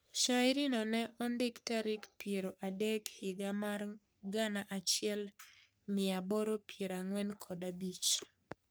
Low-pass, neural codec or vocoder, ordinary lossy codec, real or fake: none; codec, 44.1 kHz, 3.4 kbps, Pupu-Codec; none; fake